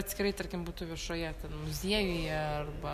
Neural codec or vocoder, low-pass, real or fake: none; 14.4 kHz; real